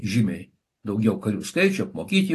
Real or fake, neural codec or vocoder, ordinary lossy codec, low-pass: real; none; AAC, 48 kbps; 14.4 kHz